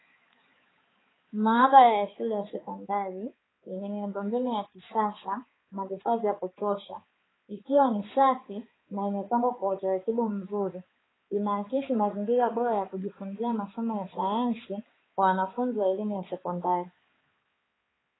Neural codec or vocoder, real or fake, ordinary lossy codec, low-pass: codec, 16 kHz, 4 kbps, X-Codec, HuBERT features, trained on balanced general audio; fake; AAC, 16 kbps; 7.2 kHz